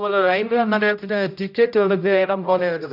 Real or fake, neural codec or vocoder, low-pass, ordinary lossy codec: fake; codec, 16 kHz, 0.5 kbps, X-Codec, HuBERT features, trained on general audio; 5.4 kHz; none